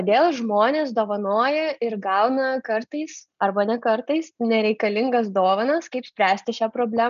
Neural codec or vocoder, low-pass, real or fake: none; 7.2 kHz; real